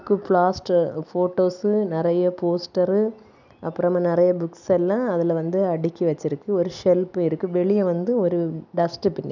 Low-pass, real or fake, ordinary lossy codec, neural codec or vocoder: 7.2 kHz; real; none; none